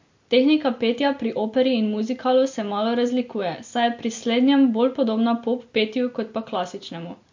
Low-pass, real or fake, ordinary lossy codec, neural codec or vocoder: 7.2 kHz; real; MP3, 48 kbps; none